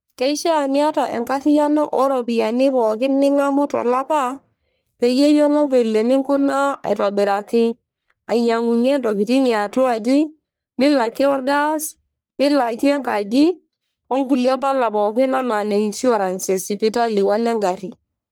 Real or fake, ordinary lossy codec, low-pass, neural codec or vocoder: fake; none; none; codec, 44.1 kHz, 1.7 kbps, Pupu-Codec